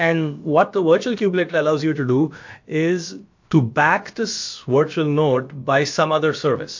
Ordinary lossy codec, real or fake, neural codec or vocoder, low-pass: MP3, 48 kbps; fake; codec, 16 kHz, about 1 kbps, DyCAST, with the encoder's durations; 7.2 kHz